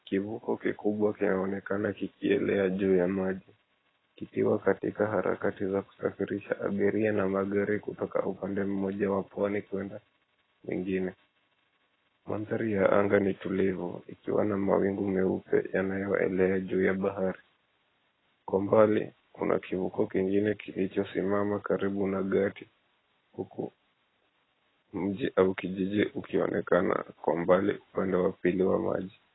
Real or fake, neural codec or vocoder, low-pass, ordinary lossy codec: real; none; 7.2 kHz; AAC, 16 kbps